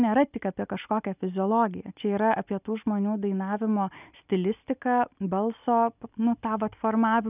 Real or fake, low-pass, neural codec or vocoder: real; 3.6 kHz; none